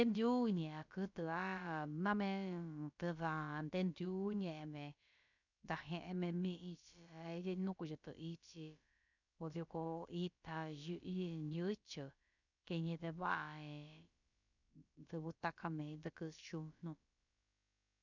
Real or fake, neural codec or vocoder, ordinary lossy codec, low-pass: fake; codec, 16 kHz, about 1 kbps, DyCAST, with the encoder's durations; none; 7.2 kHz